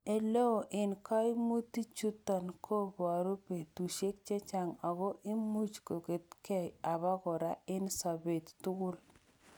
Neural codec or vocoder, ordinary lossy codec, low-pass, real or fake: none; none; none; real